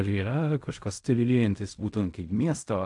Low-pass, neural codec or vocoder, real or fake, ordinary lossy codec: 10.8 kHz; codec, 16 kHz in and 24 kHz out, 0.4 kbps, LongCat-Audio-Codec, fine tuned four codebook decoder; fake; AAC, 64 kbps